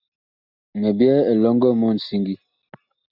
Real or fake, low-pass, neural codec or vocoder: real; 5.4 kHz; none